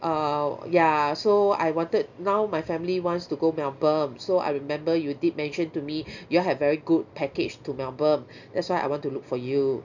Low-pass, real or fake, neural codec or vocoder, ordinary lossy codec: 7.2 kHz; real; none; none